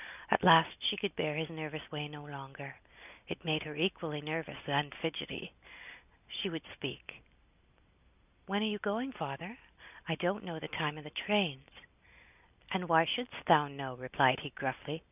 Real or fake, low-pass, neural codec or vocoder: real; 3.6 kHz; none